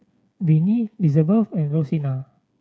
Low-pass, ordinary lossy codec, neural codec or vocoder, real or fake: none; none; codec, 16 kHz, 8 kbps, FreqCodec, smaller model; fake